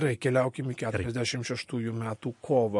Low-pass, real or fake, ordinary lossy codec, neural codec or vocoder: 10.8 kHz; real; MP3, 48 kbps; none